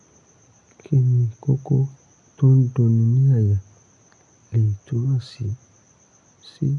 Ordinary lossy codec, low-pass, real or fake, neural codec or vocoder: none; 10.8 kHz; real; none